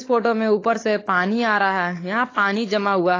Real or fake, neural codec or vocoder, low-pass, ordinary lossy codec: fake; codec, 16 kHz, 16 kbps, FunCodec, trained on LibriTTS, 50 frames a second; 7.2 kHz; AAC, 32 kbps